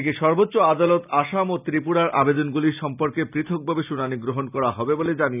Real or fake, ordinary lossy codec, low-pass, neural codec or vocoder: real; none; 3.6 kHz; none